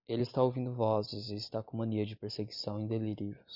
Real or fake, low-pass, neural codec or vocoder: real; 5.4 kHz; none